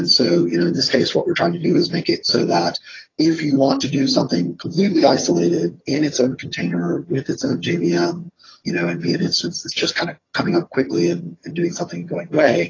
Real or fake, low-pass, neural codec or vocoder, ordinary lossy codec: fake; 7.2 kHz; vocoder, 22.05 kHz, 80 mel bands, HiFi-GAN; AAC, 32 kbps